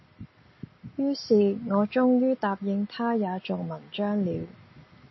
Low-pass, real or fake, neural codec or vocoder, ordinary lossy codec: 7.2 kHz; real; none; MP3, 24 kbps